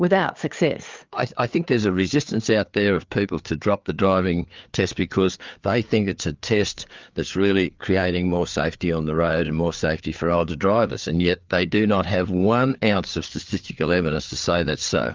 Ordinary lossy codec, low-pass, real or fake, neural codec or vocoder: Opus, 24 kbps; 7.2 kHz; fake; codec, 16 kHz, 4 kbps, FreqCodec, larger model